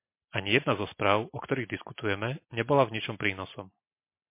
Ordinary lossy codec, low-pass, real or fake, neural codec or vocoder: MP3, 24 kbps; 3.6 kHz; real; none